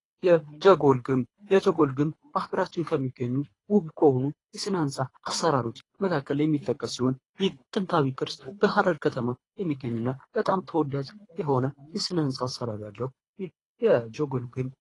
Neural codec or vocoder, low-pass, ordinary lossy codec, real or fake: codec, 24 kHz, 3 kbps, HILCodec; 10.8 kHz; AAC, 32 kbps; fake